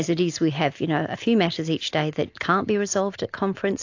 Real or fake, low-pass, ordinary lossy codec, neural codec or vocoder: real; 7.2 kHz; AAC, 48 kbps; none